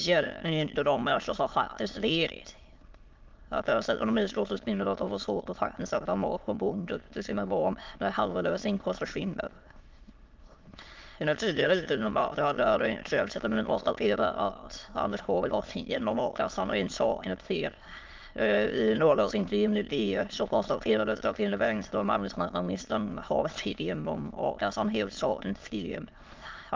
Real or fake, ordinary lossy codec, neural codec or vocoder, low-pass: fake; Opus, 32 kbps; autoencoder, 22.05 kHz, a latent of 192 numbers a frame, VITS, trained on many speakers; 7.2 kHz